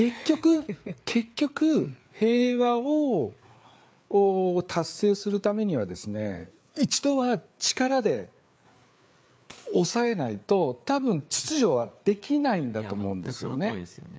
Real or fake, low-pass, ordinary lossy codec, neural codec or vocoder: fake; none; none; codec, 16 kHz, 4 kbps, FreqCodec, larger model